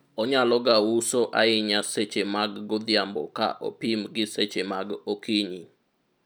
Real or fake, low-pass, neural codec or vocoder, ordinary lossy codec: real; none; none; none